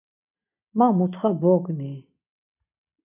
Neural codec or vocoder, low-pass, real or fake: none; 3.6 kHz; real